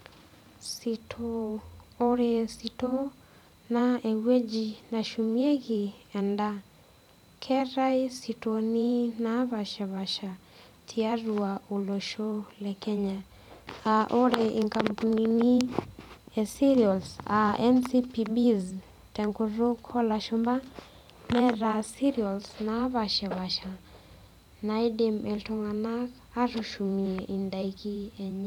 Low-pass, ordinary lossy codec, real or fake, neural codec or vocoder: 19.8 kHz; none; fake; vocoder, 44.1 kHz, 128 mel bands every 512 samples, BigVGAN v2